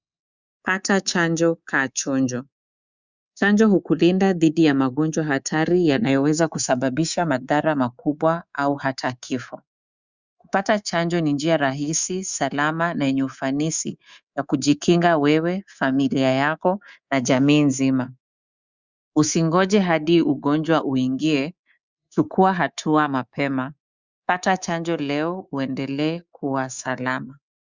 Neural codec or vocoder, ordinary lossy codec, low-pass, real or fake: codec, 24 kHz, 3.1 kbps, DualCodec; Opus, 64 kbps; 7.2 kHz; fake